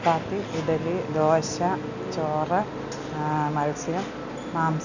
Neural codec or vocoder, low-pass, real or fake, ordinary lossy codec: none; 7.2 kHz; real; none